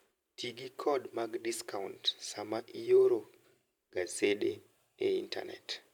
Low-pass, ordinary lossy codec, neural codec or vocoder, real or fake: 19.8 kHz; none; vocoder, 44.1 kHz, 128 mel bands every 512 samples, BigVGAN v2; fake